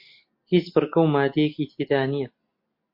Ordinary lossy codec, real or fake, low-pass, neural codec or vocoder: MP3, 32 kbps; real; 5.4 kHz; none